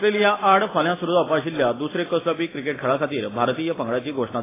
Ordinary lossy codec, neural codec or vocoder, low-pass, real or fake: AAC, 16 kbps; none; 3.6 kHz; real